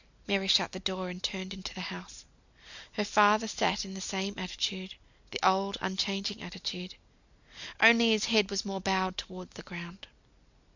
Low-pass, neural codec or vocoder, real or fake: 7.2 kHz; none; real